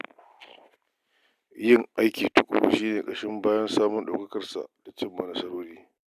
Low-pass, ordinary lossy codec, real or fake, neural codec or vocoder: 14.4 kHz; none; real; none